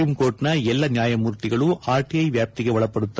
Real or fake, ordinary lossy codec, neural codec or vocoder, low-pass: real; none; none; none